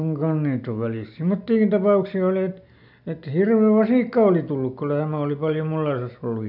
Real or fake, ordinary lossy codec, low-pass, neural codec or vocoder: real; none; 5.4 kHz; none